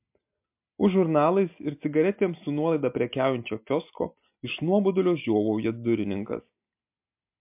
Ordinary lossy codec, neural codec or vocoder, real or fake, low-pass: MP3, 32 kbps; none; real; 3.6 kHz